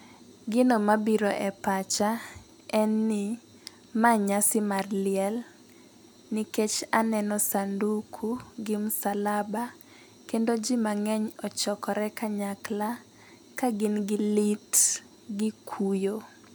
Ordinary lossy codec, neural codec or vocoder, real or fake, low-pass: none; none; real; none